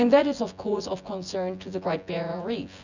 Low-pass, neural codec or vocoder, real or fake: 7.2 kHz; vocoder, 24 kHz, 100 mel bands, Vocos; fake